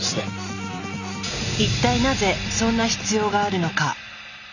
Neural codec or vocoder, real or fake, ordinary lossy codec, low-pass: none; real; none; 7.2 kHz